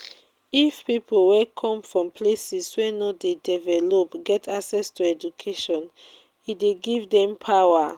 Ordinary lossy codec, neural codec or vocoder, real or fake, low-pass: Opus, 24 kbps; none; real; 19.8 kHz